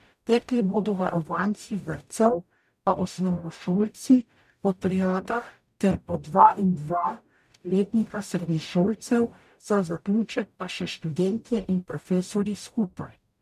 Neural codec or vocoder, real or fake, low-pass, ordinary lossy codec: codec, 44.1 kHz, 0.9 kbps, DAC; fake; 14.4 kHz; MP3, 96 kbps